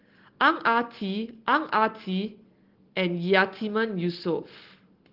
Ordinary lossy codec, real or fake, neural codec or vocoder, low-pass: Opus, 16 kbps; real; none; 5.4 kHz